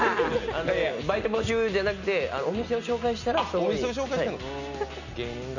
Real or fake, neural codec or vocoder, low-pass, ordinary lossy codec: real; none; 7.2 kHz; none